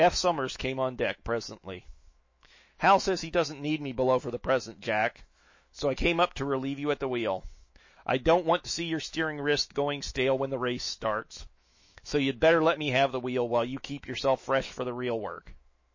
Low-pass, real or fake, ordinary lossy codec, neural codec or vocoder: 7.2 kHz; fake; MP3, 32 kbps; autoencoder, 48 kHz, 128 numbers a frame, DAC-VAE, trained on Japanese speech